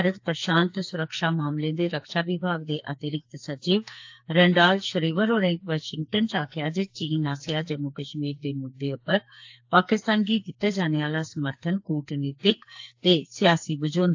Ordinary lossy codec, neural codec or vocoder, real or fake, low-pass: AAC, 48 kbps; codec, 44.1 kHz, 2.6 kbps, SNAC; fake; 7.2 kHz